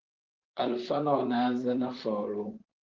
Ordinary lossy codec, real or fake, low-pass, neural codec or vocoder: Opus, 32 kbps; fake; 7.2 kHz; vocoder, 44.1 kHz, 128 mel bands, Pupu-Vocoder